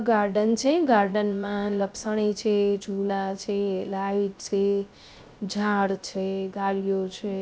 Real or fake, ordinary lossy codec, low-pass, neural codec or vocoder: fake; none; none; codec, 16 kHz, 0.3 kbps, FocalCodec